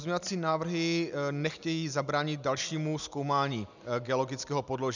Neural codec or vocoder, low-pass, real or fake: none; 7.2 kHz; real